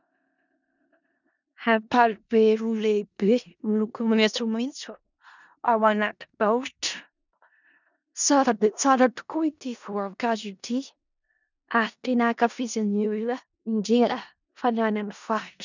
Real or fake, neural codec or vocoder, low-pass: fake; codec, 16 kHz in and 24 kHz out, 0.4 kbps, LongCat-Audio-Codec, four codebook decoder; 7.2 kHz